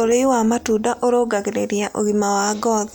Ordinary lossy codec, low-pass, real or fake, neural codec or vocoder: none; none; real; none